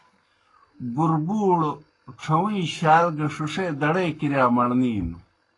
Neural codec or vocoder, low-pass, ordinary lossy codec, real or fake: codec, 44.1 kHz, 7.8 kbps, Pupu-Codec; 10.8 kHz; AAC, 32 kbps; fake